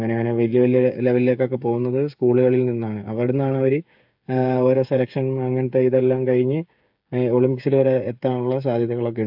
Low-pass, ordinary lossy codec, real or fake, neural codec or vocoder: 5.4 kHz; AAC, 48 kbps; fake; codec, 16 kHz, 8 kbps, FreqCodec, smaller model